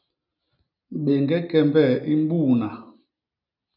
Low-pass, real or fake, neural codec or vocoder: 5.4 kHz; real; none